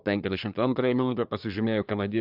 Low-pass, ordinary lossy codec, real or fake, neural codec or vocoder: 5.4 kHz; AAC, 48 kbps; fake; codec, 24 kHz, 1 kbps, SNAC